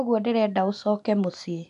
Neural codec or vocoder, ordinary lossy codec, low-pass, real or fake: none; AAC, 96 kbps; 10.8 kHz; real